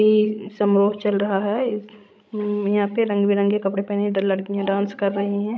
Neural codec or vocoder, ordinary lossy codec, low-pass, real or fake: codec, 16 kHz, 16 kbps, FreqCodec, larger model; none; none; fake